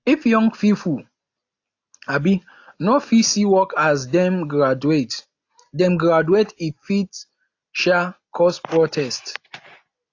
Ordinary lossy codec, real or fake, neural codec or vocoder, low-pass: AAC, 48 kbps; real; none; 7.2 kHz